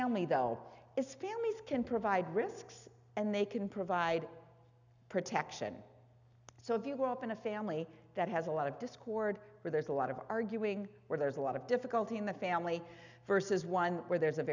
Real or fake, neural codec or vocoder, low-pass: real; none; 7.2 kHz